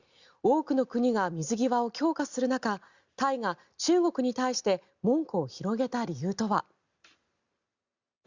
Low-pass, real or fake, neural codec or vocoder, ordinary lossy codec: 7.2 kHz; real; none; Opus, 64 kbps